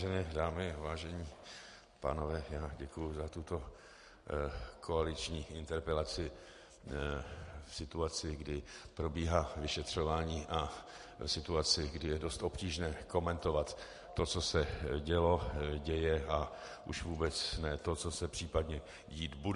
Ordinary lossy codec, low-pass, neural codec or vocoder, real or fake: MP3, 48 kbps; 10.8 kHz; none; real